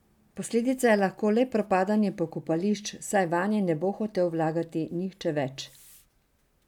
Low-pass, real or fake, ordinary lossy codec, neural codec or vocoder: 19.8 kHz; real; none; none